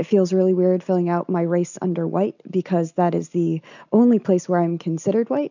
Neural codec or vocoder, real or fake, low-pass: none; real; 7.2 kHz